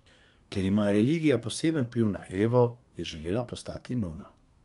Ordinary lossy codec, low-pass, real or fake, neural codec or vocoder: none; 10.8 kHz; fake; codec, 24 kHz, 1 kbps, SNAC